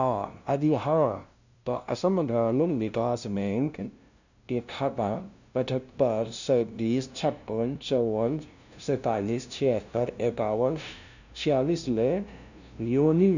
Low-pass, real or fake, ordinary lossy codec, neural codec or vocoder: 7.2 kHz; fake; none; codec, 16 kHz, 0.5 kbps, FunCodec, trained on LibriTTS, 25 frames a second